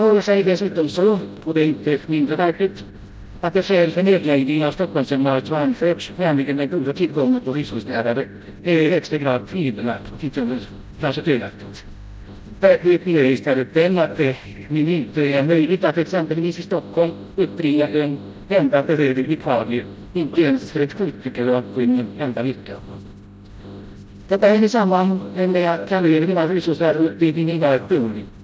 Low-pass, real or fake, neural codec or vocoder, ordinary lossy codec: none; fake; codec, 16 kHz, 0.5 kbps, FreqCodec, smaller model; none